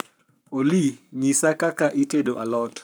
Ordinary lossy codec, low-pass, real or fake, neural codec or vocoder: none; none; fake; codec, 44.1 kHz, 7.8 kbps, Pupu-Codec